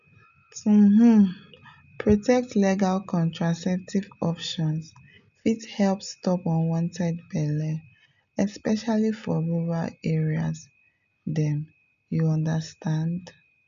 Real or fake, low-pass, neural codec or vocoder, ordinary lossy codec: real; 7.2 kHz; none; none